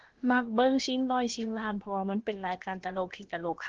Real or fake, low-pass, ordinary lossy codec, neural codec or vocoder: fake; 7.2 kHz; Opus, 16 kbps; codec, 16 kHz, about 1 kbps, DyCAST, with the encoder's durations